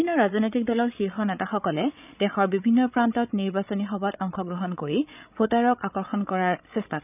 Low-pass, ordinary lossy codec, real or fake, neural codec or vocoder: 3.6 kHz; none; fake; codec, 16 kHz, 16 kbps, FreqCodec, larger model